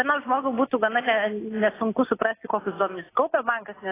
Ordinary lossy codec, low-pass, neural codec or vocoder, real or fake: AAC, 16 kbps; 3.6 kHz; none; real